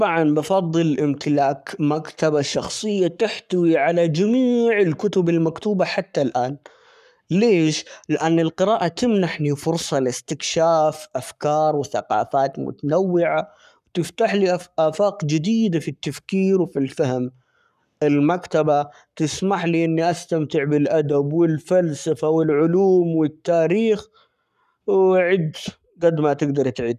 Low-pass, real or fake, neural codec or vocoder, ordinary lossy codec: 14.4 kHz; fake; codec, 44.1 kHz, 7.8 kbps, Pupu-Codec; none